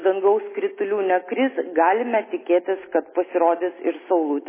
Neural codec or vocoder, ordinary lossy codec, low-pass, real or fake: none; MP3, 16 kbps; 3.6 kHz; real